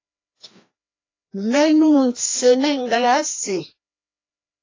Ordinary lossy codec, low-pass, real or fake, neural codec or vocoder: AAC, 32 kbps; 7.2 kHz; fake; codec, 16 kHz, 1 kbps, FreqCodec, larger model